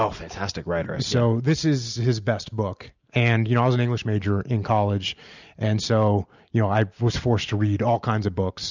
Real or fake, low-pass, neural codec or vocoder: real; 7.2 kHz; none